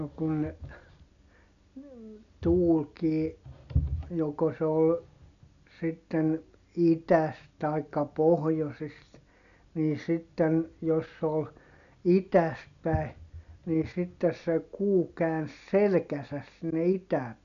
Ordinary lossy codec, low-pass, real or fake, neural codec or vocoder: none; 7.2 kHz; real; none